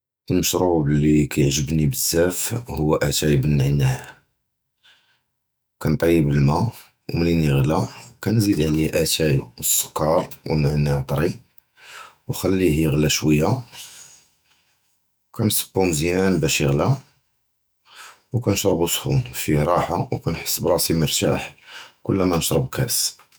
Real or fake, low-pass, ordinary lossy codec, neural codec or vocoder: fake; none; none; autoencoder, 48 kHz, 128 numbers a frame, DAC-VAE, trained on Japanese speech